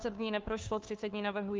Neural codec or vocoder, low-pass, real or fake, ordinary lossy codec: codec, 16 kHz, 2 kbps, FunCodec, trained on Chinese and English, 25 frames a second; 7.2 kHz; fake; Opus, 24 kbps